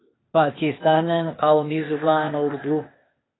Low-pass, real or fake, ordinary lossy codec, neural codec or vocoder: 7.2 kHz; fake; AAC, 16 kbps; codec, 16 kHz, 0.8 kbps, ZipCodec